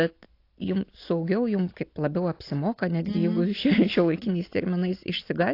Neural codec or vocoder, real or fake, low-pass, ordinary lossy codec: none; real; 5.4 kHz; AAC, 32 kbps